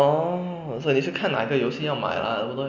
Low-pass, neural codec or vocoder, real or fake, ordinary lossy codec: 7.2 kHz; none; real; AAC, 48 kbps